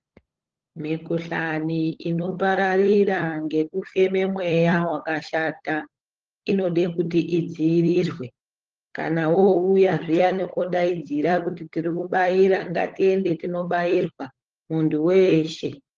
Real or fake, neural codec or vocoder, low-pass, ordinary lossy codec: fake; codec, 16 kHz, 16 kbps, FunCodec, trained on LibriTTS, 50 frames a second; 7.2 kHz; Opus, 16 kbps